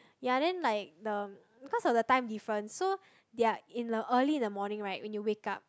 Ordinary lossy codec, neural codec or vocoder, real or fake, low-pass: none; none; real; none